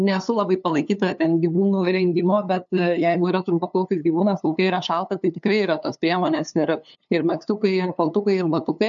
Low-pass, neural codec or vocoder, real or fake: 7.2 kHz; codec, 16 kHz, 2 kbps, FunCodec, trained on LibriTTS, 25 frames a second; fake